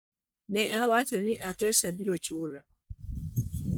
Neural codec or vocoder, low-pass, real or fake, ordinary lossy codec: codec, 44.1 kHz, 1.7 kbps, Pupu-Codec; none; fake; none